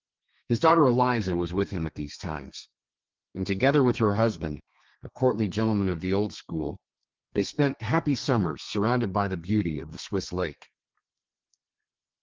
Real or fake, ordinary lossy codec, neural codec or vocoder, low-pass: fake; Opus, 24 kbps; codec, 32 kHz, 1.9 kbps, SNAC; 7.2 kHz